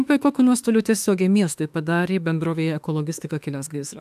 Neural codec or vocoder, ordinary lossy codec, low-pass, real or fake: autoencoder, 48 kHz, 32 numbers a frame, DAC-VAE, trained on Japanese speech; AAC, 96 kbps; 14.4 kHz; fake